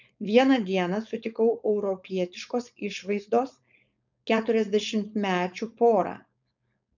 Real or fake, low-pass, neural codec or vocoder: fake; 7.2 kHz; codec, 16 kHz, 4.8 kbps, FACodec